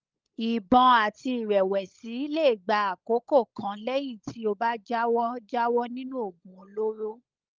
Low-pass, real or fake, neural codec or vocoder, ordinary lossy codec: 7.2 kHz; fake; codec, 16 kHz, 16 kbps, FunCodec, trained on LibriTTS, 50 frames a second; Opus, 32 kbps